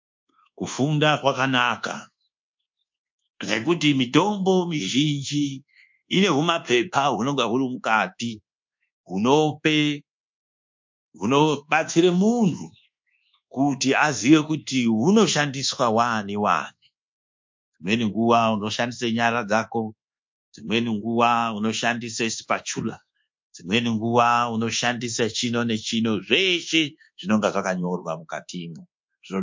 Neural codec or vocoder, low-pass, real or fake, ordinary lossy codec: codec, 24 kHz, 1.2 kbps, DualCodec; 7.2 kHz; fake; MP3, 48 kbps